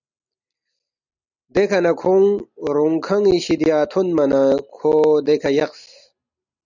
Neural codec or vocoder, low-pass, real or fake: none; 7.2 kHz; real